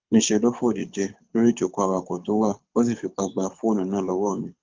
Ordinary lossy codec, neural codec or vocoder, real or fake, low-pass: Opus, 32 kbps; codec, 24 kHz, 6 kbps, HILCodec; fake; 7.2 kHz